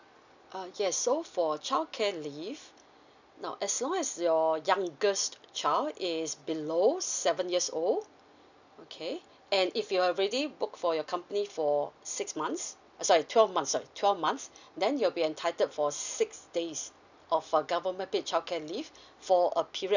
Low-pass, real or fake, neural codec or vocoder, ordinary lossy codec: 7.2 kHz; real; none; none